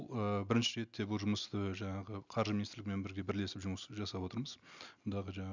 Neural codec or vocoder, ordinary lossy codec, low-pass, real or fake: none; none; 7.2 kHz; real